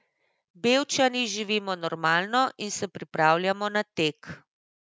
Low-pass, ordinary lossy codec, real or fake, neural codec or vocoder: none; none; real; none